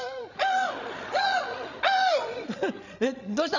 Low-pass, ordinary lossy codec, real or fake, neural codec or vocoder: 7.2 kHz; none; fake; codec, 16 kHz, 16 kbps, FreqCodec, larger model